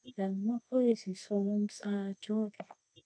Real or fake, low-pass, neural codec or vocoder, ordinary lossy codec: fake; 9.9 kHz; codec, 24 kHz, 0.9 kbps, WavTokenizer, medium music audio release; MP3, 64 kbps